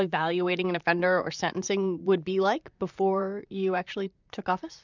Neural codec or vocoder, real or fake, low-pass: vocoder, 44.1 kHz, 128 mel bands, Pupu-Vocoder; fake; 7.2 kHz